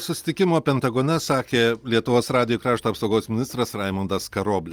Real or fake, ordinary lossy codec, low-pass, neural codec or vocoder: real; Opus, 32 kbps; 19.8 kHz; none